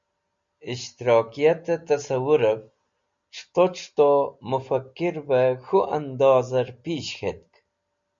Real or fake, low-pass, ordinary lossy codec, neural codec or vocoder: real; 7.2 kHz; MP3, 64 kbps; none